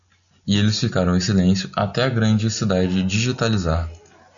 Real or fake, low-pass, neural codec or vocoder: real; 7.2 kHz; none